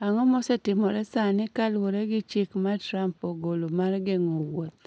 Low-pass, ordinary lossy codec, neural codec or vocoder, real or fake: none; none; none; real